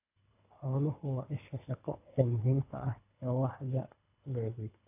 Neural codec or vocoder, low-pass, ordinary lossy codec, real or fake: codec, 24 kHz, 3 kbps, HILCodec; 3.6 kHz; AAC, 32 kbps; fake